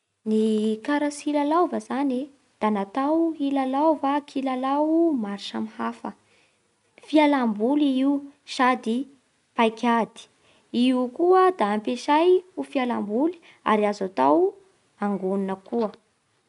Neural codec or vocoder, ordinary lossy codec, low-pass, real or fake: none; none; 10.8 kHz; real